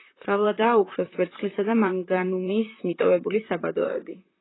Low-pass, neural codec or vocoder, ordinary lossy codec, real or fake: 7.2 kHz; vocoder, 44.1 kHz, 128 mel bands, Pupu-Vocoder; AAC, 16 kbps; fake